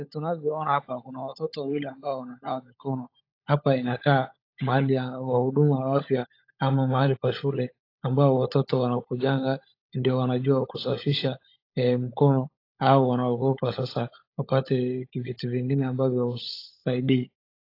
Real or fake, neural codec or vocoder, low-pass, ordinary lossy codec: fake; codec, 16 kHz, 16 kbps, FunCodec, trained on LibriTTS, 50 frames a second; 5.4 kHz; AAC, 32 kbps